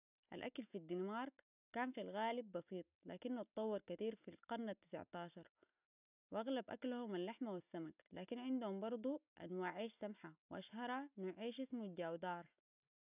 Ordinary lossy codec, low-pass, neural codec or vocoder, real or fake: none; 3.6 kHz; none; real